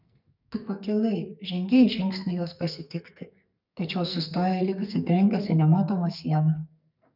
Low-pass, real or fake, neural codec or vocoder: 5.4 kHz; fake; codec, 44.1 kHz, 2.6 kbps, SNAC